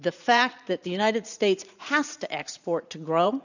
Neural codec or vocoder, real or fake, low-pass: vocoder, 44.1 kHz, 80 mel bands, Vocos; fake; 7.2 kHz